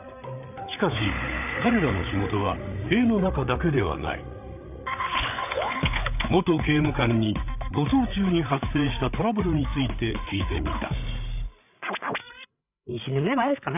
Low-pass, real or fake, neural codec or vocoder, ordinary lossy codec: 3.6 kHz; fake; codec, 16 kHz, 8 kbps, FreqCodec, larger model; none